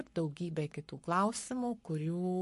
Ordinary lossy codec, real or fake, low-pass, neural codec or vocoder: MP3, 48 kbps; fake; 14.4 kHz; codec, 44.1 kHz, 7.8 kbps, Pupu-Codec